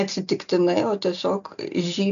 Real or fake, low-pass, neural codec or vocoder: real; 7.2 kHz; none